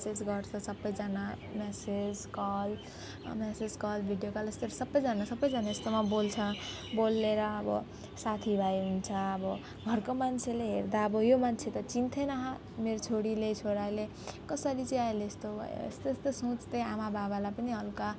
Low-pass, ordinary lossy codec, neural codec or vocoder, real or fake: none; none; none; real